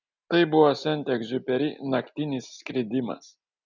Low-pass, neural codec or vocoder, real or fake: 7.2 kHz; none; real